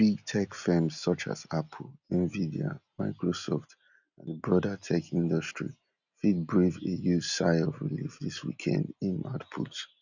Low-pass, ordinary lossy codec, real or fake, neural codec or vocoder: 7.2 kHz; none; real; none